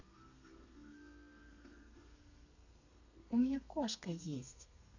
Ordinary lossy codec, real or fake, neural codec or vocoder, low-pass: none; fake; codec, 32 kHz, 1.9 kbps, SNAC; 7.2 kHz